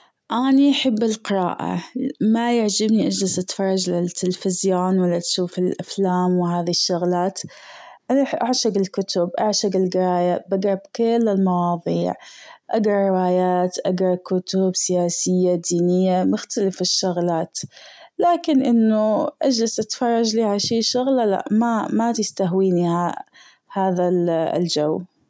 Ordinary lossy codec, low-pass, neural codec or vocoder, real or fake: none; none; none; real